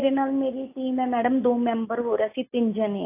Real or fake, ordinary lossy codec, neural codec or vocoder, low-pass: real; AAC, 24 kbps; none; 3.6 kHz